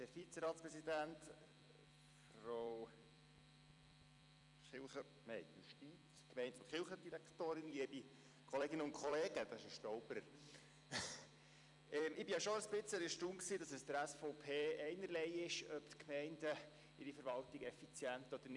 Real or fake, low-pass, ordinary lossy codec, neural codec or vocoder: fake; 10.8 kHz; none; vocoder, 44.1 kHz, 128 mel bands every 256 samples, BigVGAN v2